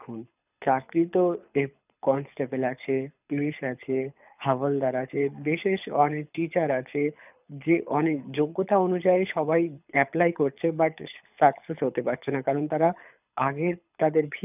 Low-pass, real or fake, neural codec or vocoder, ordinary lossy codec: 3.6 kHz; fake; codec, 24 kHz, 6 kbps, HILCodec; none